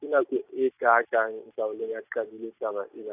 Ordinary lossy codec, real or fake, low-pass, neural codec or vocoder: AAC, 32 kbps; real; 3.6 kHz; none